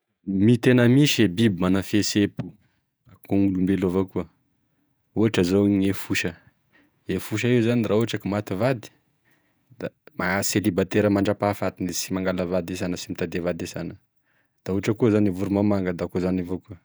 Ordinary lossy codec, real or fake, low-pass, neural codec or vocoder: none; fake; none; vocoder, 48 kHz, 128 mel bands, Vocos